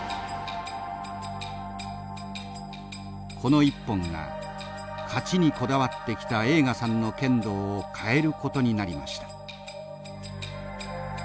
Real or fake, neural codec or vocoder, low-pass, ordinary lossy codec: real; none; none; none